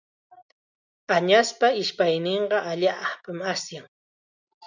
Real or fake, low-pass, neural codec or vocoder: real; 7.2 kHz; none